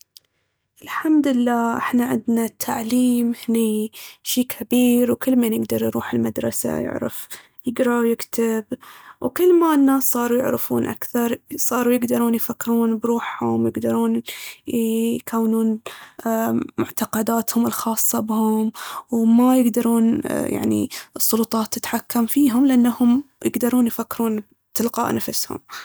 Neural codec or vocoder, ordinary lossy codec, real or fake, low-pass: none; none; real; none